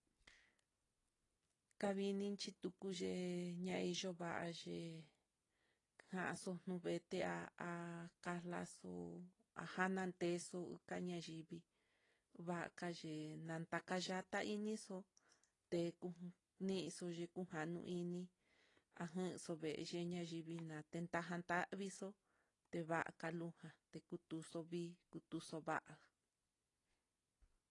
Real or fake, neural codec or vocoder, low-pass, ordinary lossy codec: real; none; 10.8 kHz; AAC, 32 kbps